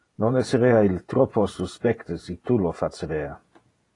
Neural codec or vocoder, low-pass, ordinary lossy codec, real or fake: vocoder, 48 kHz, 128 mel bands, Vocos; 10.8 kHz; AAC, 32 kbps; fake